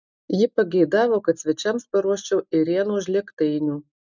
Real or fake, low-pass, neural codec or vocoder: real; 7.2 kHz; none